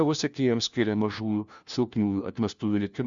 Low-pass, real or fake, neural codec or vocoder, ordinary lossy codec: 7.2 kHz; fake; codec, 16 kHz, 1 kbps, FunCodec, trained on LibriTTS, 50 frames a second; Opus, 64 kbps